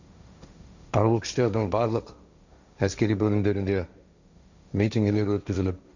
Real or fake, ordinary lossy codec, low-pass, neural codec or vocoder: fake; none; 7.2 kHz; codec, 16 kHz, 1.1 kbps, Voila-Tokenizer